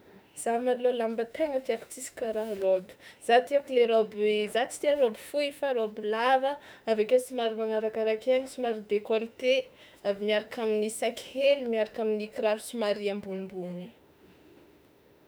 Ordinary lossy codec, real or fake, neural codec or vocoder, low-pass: none; fake; autoencoder, 48 kHz, 32 numbers a frame, DAC-VAE, trained on Japanese speech; none